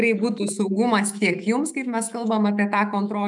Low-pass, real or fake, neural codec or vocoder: 10.8 kHz; fake; autoencoder, 48 kHz, 128 numbers a frame, DAC-VAE, trained on Japanese speech